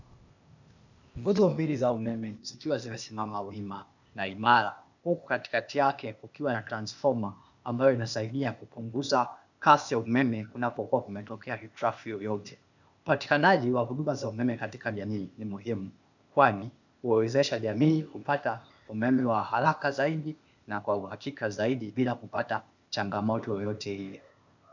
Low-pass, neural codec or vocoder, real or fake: 7.2 kHz; codec, 16 kHz, 0.8 kbps, ZipCodec; fake